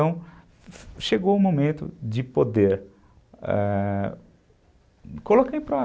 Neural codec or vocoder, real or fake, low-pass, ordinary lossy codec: none; real; none; none